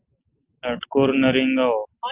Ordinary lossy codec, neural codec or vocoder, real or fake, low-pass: Opus, 32 kbps; none; real; 3.6 kHz